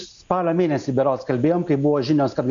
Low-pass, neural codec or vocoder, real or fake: 7.2 kHz; none; real